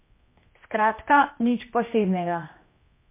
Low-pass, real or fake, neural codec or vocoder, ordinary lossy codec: 3.6 kHz; fake; codec, 16 kHz, 1 kbps, X-Codec, HuBERT features, trained on general audio; MP3, 24 kbps